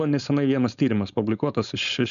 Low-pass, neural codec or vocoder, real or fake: 7.2 kHz; codec, 16 kHz, 4.8 kbps, FACodec; fake